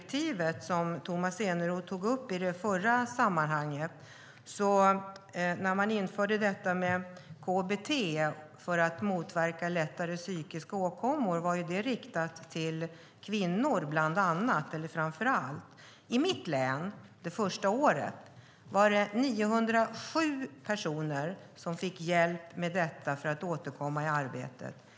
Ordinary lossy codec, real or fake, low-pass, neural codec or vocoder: none; real; none; none